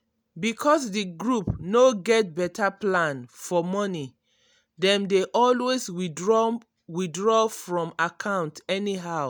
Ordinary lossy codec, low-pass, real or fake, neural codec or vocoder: none; none; real; none